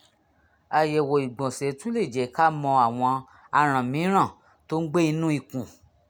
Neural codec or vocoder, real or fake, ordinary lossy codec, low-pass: none; real; none; 19.8 kHz